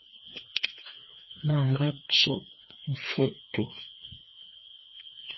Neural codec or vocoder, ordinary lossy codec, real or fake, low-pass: codec, 16 kHz, 2 kbps, FreqCodec, larger model; MP3, 24 kbps; fake; 7.2 kHz